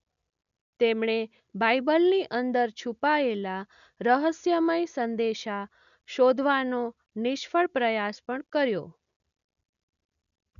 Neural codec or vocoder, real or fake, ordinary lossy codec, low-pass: none; real; none; 7.2 kHz